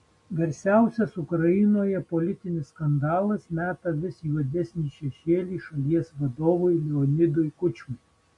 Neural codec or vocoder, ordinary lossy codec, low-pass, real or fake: none; MP3, 48 kbps; 10.8 kHz; real